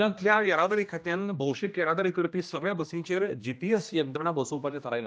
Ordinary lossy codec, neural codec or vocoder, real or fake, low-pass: none; codec, 16 kHz, 1 kbps, X-Codec, HuBERT features, trained on general audio; fake; none